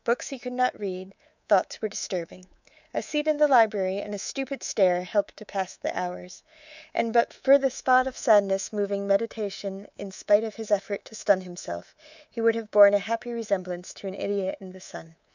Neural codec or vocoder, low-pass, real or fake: codec, 24 kHz, 3.1 kbps, DualCodec; 7.2 kHz; fake